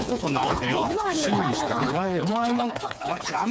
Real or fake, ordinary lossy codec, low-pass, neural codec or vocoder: fake; none; none; codec, 16 kHz, 4 kbps, FreqCodec, smaller model